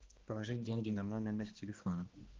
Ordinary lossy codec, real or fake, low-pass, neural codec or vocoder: Opus, 16 kbps; fake; 7.2 kHz; codec, 16 kHz, 1 kbps, X-Codec, HuBERT features, trained on balanced general audio